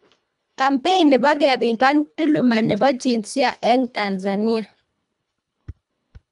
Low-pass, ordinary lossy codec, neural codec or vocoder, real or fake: 10.8 kHz; none; codec, 24 kHz, 1.5 kbps, HILCodec; fake